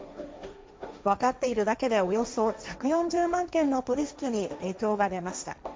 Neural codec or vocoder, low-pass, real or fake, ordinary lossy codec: codec, 16 kHz, 1.1 kbps, Voila-Tokenizer; none; fake; none